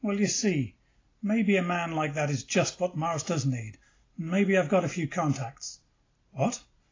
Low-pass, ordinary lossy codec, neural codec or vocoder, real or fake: 7.2 kHz; AAC, 32 kbps; none; real